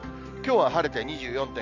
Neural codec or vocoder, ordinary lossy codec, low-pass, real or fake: none; none; 7.2 kHz; real